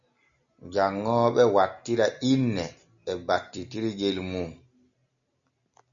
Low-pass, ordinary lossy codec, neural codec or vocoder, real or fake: 7.2 kHz; MP3, 96 kbps; none; real